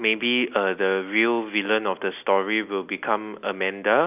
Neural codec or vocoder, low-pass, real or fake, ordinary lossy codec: none; 3.6 kHz; real; none